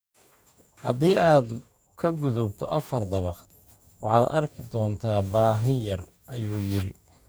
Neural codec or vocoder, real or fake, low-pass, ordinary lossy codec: codec, 44.1 kHz, 2.6 kbps, DAC; fake; none; none